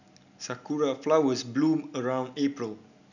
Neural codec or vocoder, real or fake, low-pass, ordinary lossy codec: none; real; 7.2 kHz; none